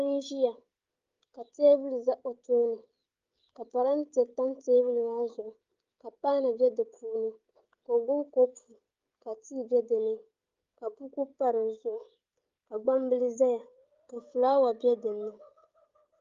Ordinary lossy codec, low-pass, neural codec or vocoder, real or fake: Opus, 32 kbps; 7.2 kHz; codec, 16 kHz, 16 kbps, FreqCodec, smaller model; fake